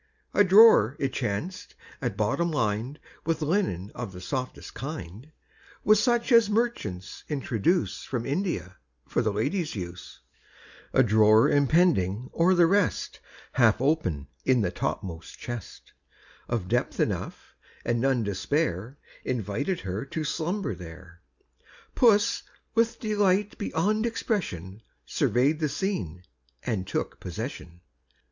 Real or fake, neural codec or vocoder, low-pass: real; none; 7.2 kHz